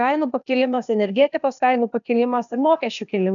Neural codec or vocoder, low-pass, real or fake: codec, 16 kHz, 0.8 kbps, ZipCodec; 7.2 kHz; fake